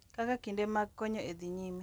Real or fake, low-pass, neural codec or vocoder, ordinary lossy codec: real; none; none; none